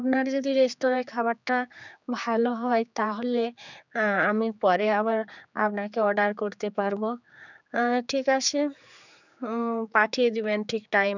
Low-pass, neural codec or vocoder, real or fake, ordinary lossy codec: 7.2 kHz; codec, 16 kHz, 4 kbps, X-Codec, HuBERT features, trained on general audio; fake; none